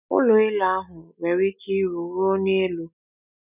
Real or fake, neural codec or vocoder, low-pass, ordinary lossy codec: real; none; 3.6 kHz; none